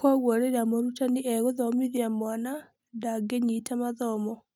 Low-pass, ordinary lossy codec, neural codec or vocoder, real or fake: 19.8 kHz; none; none; real